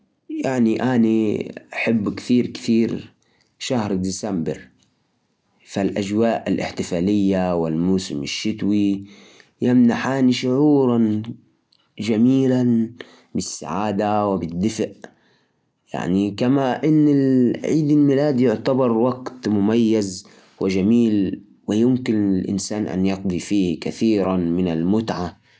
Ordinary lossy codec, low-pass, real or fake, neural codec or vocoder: none; none; real; none